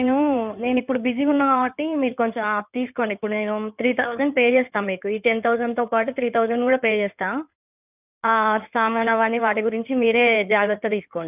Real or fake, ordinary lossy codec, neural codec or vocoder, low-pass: fake; none; codec, 16 kHz in and 24 kHz out, 2.2 kbps, FireRedTTS-2 codec; 3.6 kHz